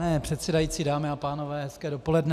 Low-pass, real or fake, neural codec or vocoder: 14.4 kHz; real; none